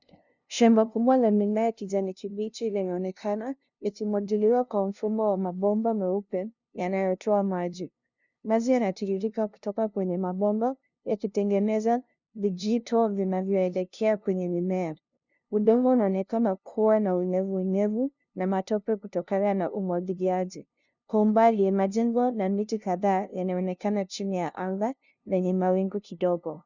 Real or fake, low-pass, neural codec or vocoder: fake; 7.2 kHz; codec, 16 kHz, 0.5 kbps, FunCodec, trained on LibriTTS, 25 frames a second